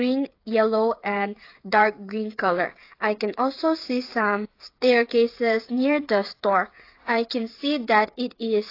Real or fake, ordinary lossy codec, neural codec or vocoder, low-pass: fake; AAC, 32 kbps; codec, 16 kHz, 8 kbps, FreqCodec, smaller model; 5.4 kHz